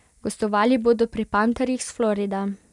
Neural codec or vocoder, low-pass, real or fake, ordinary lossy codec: none; 10.8 kHz; real; none